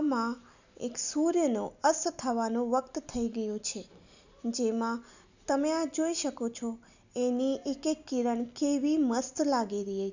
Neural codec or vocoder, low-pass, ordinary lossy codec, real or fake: none; 7.2 kHz; none; real